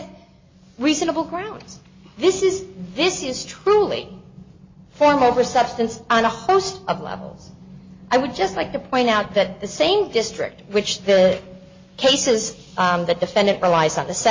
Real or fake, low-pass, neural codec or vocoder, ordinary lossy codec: real; 7.2 kHz; none; MP3, 32 kbps